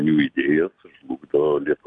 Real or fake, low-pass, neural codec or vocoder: real; 9.9 kHz; none